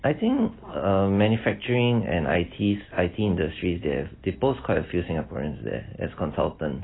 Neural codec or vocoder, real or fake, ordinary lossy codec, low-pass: none; real; AAC, 16 kbps; 7.2 kHz